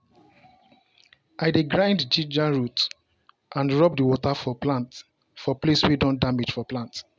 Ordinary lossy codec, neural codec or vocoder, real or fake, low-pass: none; none; real; none